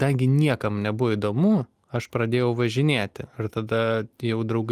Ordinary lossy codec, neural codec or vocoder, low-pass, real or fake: Opus, 32 kbps; none; 14.4 kHz; real